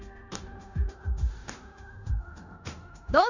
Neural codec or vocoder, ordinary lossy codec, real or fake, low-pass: codec, 16 kHz in and 24 kHz out, 0.9 kbps, LongCat-Audio-Codec, four codebook decoder; none; fake; 7.2 kHz